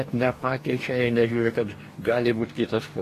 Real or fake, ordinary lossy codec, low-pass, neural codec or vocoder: fake; AAC, 48 kbps; 14.4 kHz; codec, 44.1 kHz, 2.6 kbps, DAC